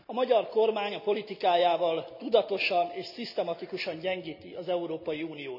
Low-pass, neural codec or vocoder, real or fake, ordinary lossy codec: 5.4 kHz; none; real; none